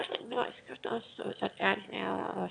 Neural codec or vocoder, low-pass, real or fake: autoencoder, 22.05 kHz, a latent of 192 numbers a frame, VITS, trained on one speaker; 9.9 kHz; fake